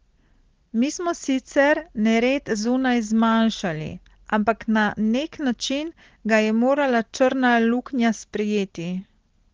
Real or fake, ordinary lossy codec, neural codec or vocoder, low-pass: real; Opus, 16 kbps; none; 7.2 kHz